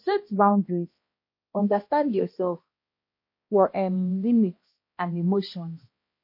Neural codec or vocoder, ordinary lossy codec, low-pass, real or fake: codec, 16 kHz, 1 kbps, X-Codec, HuBERT features, trained on balanced general audio; MP3, 32 kbps; 5.4 kHz; fake